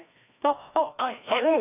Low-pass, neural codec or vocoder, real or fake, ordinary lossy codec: 3.6 kHz; codec, 16 kHz, 1 kbps, FreqCodec, larger model; fake; AAC, 32 kbps